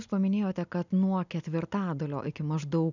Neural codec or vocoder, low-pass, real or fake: none; 7.2 kHz; real